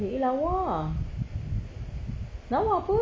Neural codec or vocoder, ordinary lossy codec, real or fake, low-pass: none; none; real; none